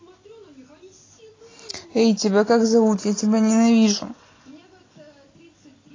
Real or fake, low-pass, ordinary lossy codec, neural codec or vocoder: real; 7.2 kHz; AAC, 32 kbps; none